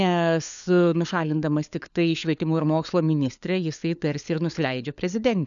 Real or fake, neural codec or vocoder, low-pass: fake; codec, 16 kHz, 4 kbps, FunCodec, trained on LibriTTS, 50 frames a second; 7.2 kHz